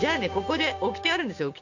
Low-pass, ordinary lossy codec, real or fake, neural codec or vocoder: 7.2 kHz; none; fake; codec, 16 kHz, 6 kbps, DAC